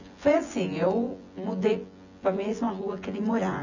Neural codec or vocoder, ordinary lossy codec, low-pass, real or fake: vocoder, 24 kHz, 100 mel bands, Vocos; none; 7.2 kHz; fake